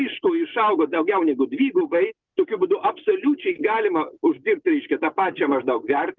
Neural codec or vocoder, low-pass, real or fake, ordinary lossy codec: none; 7.2 kHz; real; Opus, 24 kbps